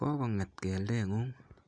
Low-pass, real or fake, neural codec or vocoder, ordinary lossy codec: 9.9 kHz; real; none; none